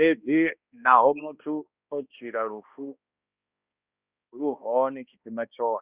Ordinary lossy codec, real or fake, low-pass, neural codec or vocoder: Opus, 64 kbps; fake; 3.6 kHz; codec, 16 kHz, 1 kbps, X-Codec, HuBERT features, trained on balanced general audio